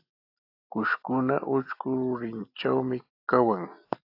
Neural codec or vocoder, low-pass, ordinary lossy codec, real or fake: none; 5.4 kHz; AAC, 32 kbps; real